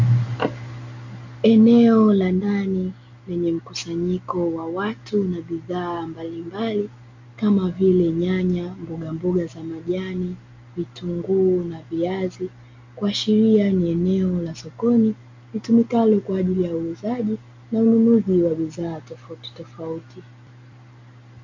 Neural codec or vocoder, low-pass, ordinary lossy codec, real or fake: none; 7.2 kHz; MP3, 48 kbps; real